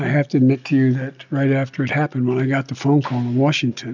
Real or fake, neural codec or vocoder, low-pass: real; none; 7.2 kHz